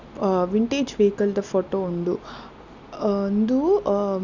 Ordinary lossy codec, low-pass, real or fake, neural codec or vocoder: none; 7.2 kHz; real; none